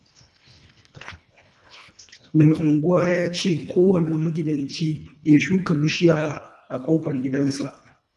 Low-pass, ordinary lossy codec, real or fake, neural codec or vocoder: none; none; fake; codec, 24 kHz, 1.5 kbps, HILCodec